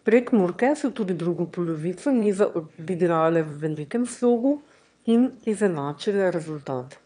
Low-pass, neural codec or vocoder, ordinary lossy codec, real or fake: 9.9 kHz; autoencoder, 22.05 kHz, a latent of 192 numbers a frame, VITS, trained on one speaker; none; fake